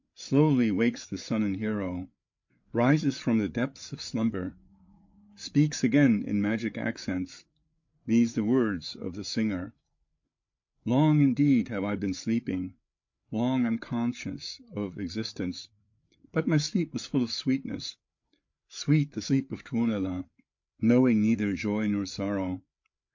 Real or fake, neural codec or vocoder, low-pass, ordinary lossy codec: fake; codec, 16 kHz, 8 kbps, FreqCodec, larger model; 7.2 kHz; MP3, 48 kbps